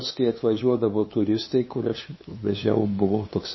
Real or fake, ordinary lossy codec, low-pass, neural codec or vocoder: fake; MP3, 24 kbps; 7.2 kHz; codec, 16 kHz, 2 kbps, FunCodec, trained on LibriTTS, 25 frames a second